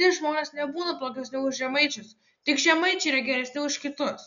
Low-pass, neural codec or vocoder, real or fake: 7.2 kHz; none; real